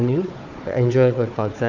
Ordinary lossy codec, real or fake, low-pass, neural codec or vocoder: none; fake; 7.2 kHz; codec, 16 kHz, 4 kbps, FunCodec, trained on Chinese and English, 50 frames a second